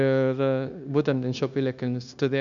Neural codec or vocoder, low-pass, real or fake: codec, 16 kHz, 0.9 kbps, LongCat-Audio-Codec; 7.2 kHz; fake